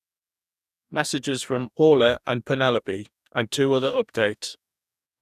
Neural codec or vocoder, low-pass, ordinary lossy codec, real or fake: codec, 44.1 kHz, 2.6 kbps, DAC; 14.4 kHz; none; fake